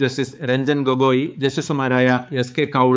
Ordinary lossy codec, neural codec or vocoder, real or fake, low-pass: none; codec, 16 kHz, 4 kbps, X-Codec, HuBERT features, trained on balanced general audio; fake; none